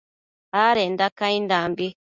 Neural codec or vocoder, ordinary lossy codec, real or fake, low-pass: none; Opus, 64 kbps; real; 7.2 kHz